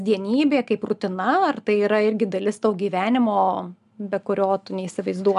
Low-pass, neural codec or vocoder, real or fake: 10.8 kHz; none; real